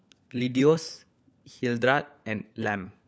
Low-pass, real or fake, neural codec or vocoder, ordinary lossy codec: none; fake; codec, 16 kHz, 4 kbps, FunCodec, trained on LibriTTS, 50 frames a second; none